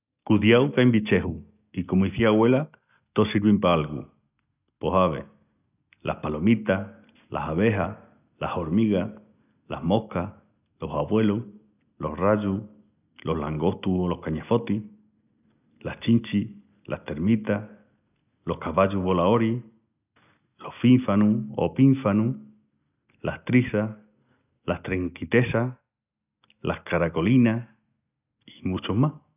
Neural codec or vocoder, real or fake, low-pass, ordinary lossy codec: none; real; 3.6 kHz; none